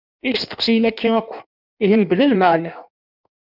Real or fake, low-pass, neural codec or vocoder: fake; 5.4 kHz; codec, 16 kHz in and 24 kHz out, 1.1 kbps, FireRedTTS-2 codec